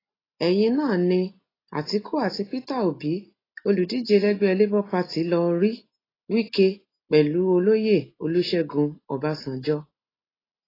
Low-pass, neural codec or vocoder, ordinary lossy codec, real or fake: 5.4 kHz; none; AAC, 24 kbps; real